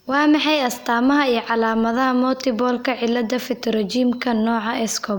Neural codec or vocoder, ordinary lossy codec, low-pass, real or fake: none; none; none; real